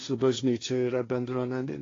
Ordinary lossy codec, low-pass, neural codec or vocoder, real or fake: AAC, 32 kbps; 7.2 kHz; codec, 16 kHz, 1.1 kbps, Voila-Tokenizer; fake